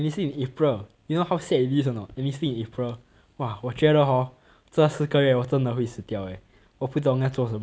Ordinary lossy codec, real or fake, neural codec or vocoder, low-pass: none; real; none; none